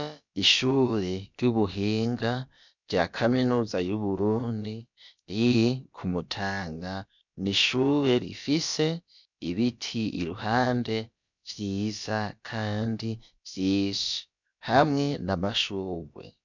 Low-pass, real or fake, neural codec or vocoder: 7.2 kHz; fake; codec, 16 kHz, about 1 kbps, DyCAST, with the encoder's durations